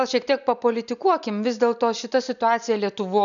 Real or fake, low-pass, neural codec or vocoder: real; 7.2 kHz; none